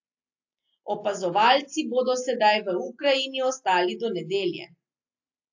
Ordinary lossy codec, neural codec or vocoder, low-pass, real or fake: AAC, 48 kbps; none; 7.2 kHz; real